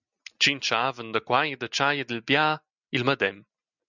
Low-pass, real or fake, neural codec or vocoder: 7.2 kHz; real; none